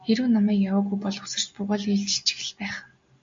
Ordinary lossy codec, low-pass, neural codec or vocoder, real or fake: MP3, 48 kbps; 7.2 kHz; none; real